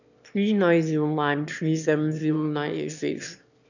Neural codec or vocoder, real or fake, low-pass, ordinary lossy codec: autoencoder, 22.05 kHz, a latent of 192 numbers a frame, VITS, trained on one speaker; fake; 7.2 kHz; none